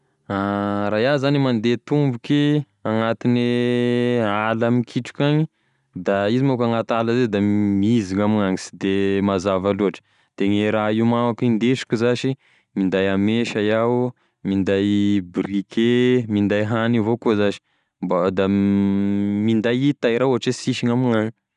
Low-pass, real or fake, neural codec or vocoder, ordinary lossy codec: 10.8 kHz; real; none; none